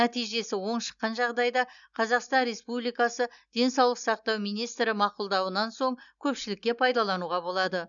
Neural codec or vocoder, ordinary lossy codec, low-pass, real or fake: none; none; 7.2 kHz; real